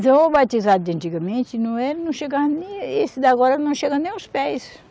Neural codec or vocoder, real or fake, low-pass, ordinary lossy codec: none; real; none; none